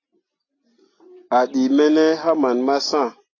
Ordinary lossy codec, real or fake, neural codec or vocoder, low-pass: AAC, 32 kbps; real; none; 7.2 kHz